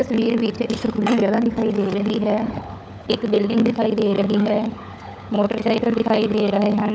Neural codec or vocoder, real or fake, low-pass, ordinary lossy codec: codec, 16 kHz, 16 kbps, FunCodec, trained on LibriTTS, 50 frames a second; fake; none; none